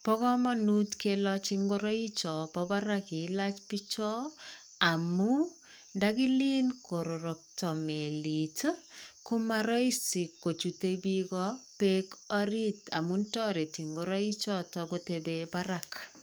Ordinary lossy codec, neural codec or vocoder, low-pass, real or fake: none; codec, 44.1 kHz, 7.8 kbps, DAC; none; fake